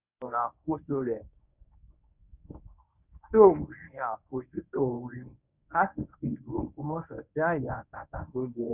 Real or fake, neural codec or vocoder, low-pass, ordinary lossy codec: fake; codec, 24 kHz, 0.9 kbps, WavTokenizer, medium speech release version 1; 3.6 kHz; none